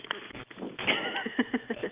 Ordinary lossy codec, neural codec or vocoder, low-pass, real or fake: Opus, 24 kbps; none; 3.6 kHz; real